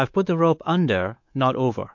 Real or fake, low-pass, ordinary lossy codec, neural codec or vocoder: fake; 7.2 kHz; MP3, 48 kbps; codec, 24 kHz, 3.1 kbps, DualCodec